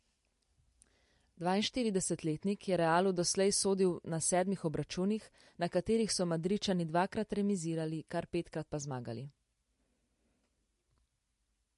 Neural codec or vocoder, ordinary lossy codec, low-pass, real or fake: none; MP3, 48 kbps; 14.4 kHz; real